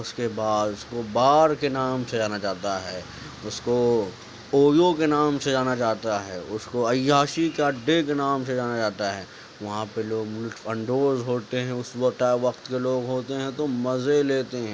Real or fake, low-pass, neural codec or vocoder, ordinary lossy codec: real; none; none; none